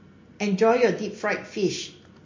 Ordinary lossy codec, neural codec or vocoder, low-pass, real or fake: MP3, 32 kbps; none; 7.2 kHz; real